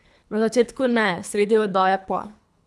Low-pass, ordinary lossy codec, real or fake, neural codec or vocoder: none; none; fake; codec, 24 kHz, 3 kbps, HILCodec